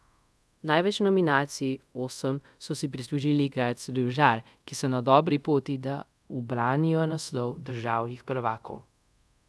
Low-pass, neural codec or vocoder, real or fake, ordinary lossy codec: none; codec, 24 kHz, 0.5 kbps, DualCodec; fake; none